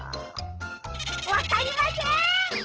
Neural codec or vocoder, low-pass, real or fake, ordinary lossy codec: none; 7.2 kHz; real; Opus, 16 kbps